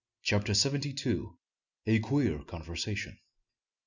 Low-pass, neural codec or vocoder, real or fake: 7.2 kHz; none; real